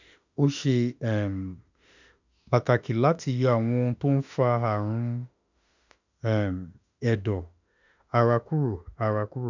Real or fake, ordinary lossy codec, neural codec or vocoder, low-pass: fake; none; autoencoder, 48 kHz, 32 numbers a frame, DAC-VAE, trained on Japanese speech; 7.2 kHz